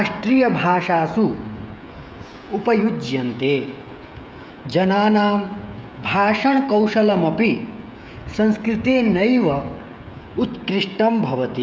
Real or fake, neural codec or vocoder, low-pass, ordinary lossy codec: fake; codec, 16 kHz, 16 kbps, FreqCodec, smaller model; none; none